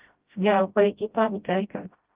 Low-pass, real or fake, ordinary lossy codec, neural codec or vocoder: 3.6 kHz; fake; Opus, 32 kbps; codec, 16 kHz, 0.5 kbps, FreqCodec, smaller model